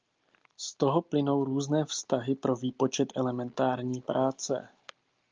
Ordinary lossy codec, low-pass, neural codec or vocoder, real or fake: Opus, 24 kbps; 7.2 kHz; none; real